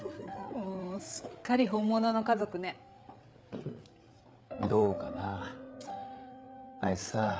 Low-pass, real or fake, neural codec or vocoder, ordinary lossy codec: none; fake; codec, 16 kHz, 8 kbps, FreqCodec, larger model; none